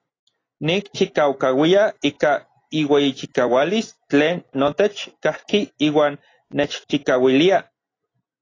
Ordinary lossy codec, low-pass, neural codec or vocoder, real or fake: AAC, 32 kbps; 7.2 kHz; none; real